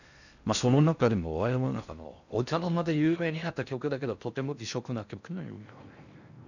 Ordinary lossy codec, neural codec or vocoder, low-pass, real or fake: none; codec, 16 kHz in and 24 kHz out, 0.6 kbps, FocalCodec, streaming, 4096 codes; 7.2 kHz; fake